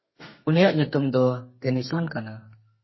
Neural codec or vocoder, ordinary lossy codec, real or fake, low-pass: codec, 32 kHz, 1.9 kbps, SNAC; MP3, 24 kbps; fake; 7.2 kHz